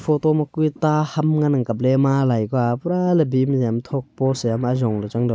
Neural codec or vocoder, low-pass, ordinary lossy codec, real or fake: none; none; none; real